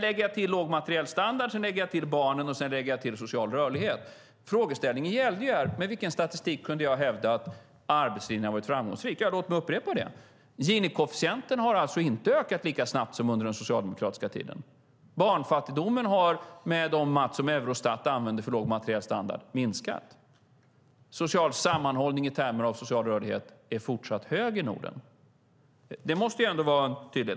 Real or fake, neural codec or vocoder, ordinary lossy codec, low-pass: real; none; none; none